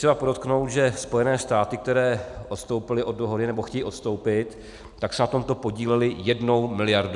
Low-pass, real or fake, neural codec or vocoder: 10.8 kHz; real; none